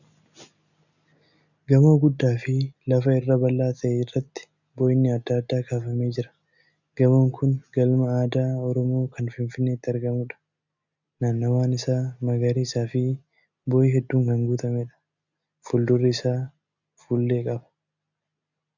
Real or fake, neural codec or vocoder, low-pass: real; none; 7.2 kHz